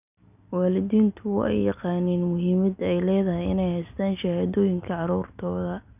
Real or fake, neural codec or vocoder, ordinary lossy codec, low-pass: real; none; none; 3.6 kHz